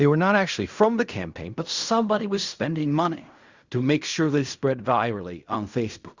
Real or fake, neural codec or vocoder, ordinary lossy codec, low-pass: fake; codec, 16 kHz in and 24 kHz out, 0.4 kbps, LongCat-Audio-Codec, fine tuned four codebook decoder; Opus, 64 kbps; 7.2 kHz